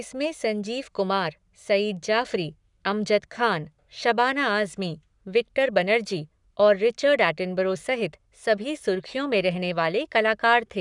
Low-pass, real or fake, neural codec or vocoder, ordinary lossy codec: 10.8 kHz; fake; codec, 44.1 kHz, 7.8 kbps, Pupu-Codec; none